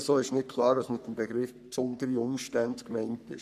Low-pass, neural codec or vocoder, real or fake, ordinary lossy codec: 14.4 kHz; codec, 44.1 kHz, 3.4 kbps, Pupu-Codec; fake; none